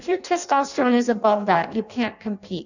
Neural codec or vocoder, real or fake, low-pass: codec, 16 kHz in and 24 kHz out, 0.6 kbps, FireRedTTS-2 codec; fake; 7.2 kHz